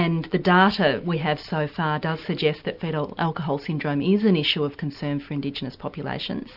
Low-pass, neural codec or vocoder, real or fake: 5.4 kHz; none; real